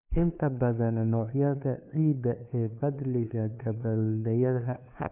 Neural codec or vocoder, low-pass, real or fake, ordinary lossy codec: codec, 16 kHz, 2 kbps, FunCodec, trained on LibriTTS, 25 frames a second; 3.6 kHz; fake; none